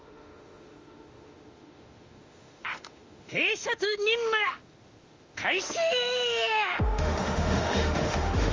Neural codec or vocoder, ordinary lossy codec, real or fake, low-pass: autoencoder, 48 kHz, 32 numbers a frame, DAC-VAE, trained on Japanese speech; Opus, 32 kbps; fake; 7.2 kHz